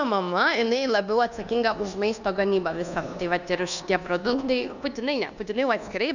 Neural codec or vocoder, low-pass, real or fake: codec, 24 kHz, 1.2 kbps, DualCodec; 7.2 kHz; fake